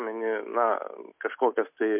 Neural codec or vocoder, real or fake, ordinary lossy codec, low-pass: none; real; MP3, 32 kbps; 3.6 kHz